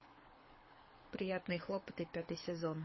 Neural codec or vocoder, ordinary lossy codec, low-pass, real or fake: codec, 24 kHz, 6 kbps, HILCodec; MP3, 24 kbps; 7.2 kHz; fake